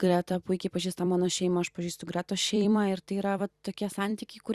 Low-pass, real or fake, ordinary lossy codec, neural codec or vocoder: 14.4 kHz; fake; Opus, 64 kbps; vocoder, 44.1 kHz, 128 mel bands every 256 samples, BigVGAN v2